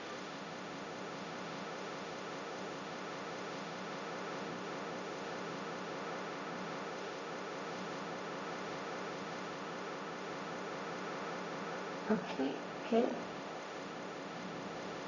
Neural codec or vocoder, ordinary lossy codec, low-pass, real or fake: codec, 16 kHz, 1.1 kbps, Voila-Tokenizer; Opus, 64 kbps; 7.2 kHz; fake